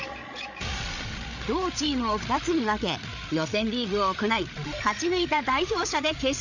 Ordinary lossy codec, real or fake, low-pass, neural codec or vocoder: none; fake; 7.2 kHz; codec, 16 kHz, 8 kbps, FreqCodec, larger model